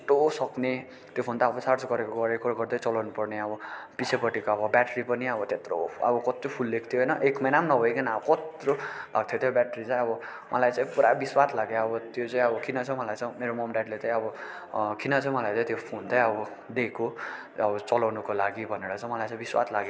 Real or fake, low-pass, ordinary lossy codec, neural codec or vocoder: real; none; none; none